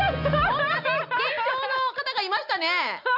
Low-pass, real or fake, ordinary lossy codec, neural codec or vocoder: 5.4 kHz; real; none; none